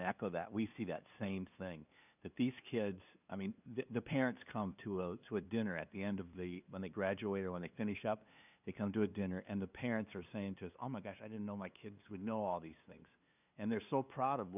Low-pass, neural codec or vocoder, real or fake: 3.6 kHz; codec, 16 kHz, 2 kbps, FunCodec, trained on LibriTTS, 25 frames a second; fake